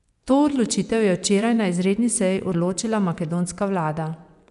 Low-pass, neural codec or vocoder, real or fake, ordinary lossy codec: 10.8 kHz; vocoder, 24 kHz, 100 mel bands, Vocos; fake; none